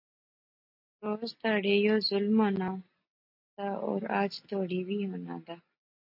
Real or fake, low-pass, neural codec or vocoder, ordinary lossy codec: real; 5.4 kHz; none; MP3, 32 kbps